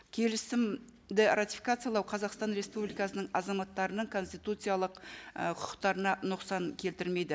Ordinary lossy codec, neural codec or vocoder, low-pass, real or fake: none; none; none; real